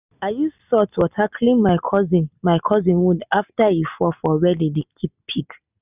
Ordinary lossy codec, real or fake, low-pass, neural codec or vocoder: none; real; 3.6 kHz; none